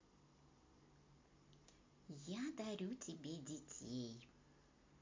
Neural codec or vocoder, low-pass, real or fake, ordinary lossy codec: none; 7.2 kHz; real; MP3, 48 kbps